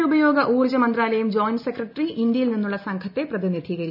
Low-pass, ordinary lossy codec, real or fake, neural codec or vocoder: 5.4 kHz; none; real; none